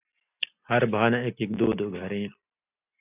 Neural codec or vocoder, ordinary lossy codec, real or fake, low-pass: none; AAC, 32 kbps; real; 3.6 kHz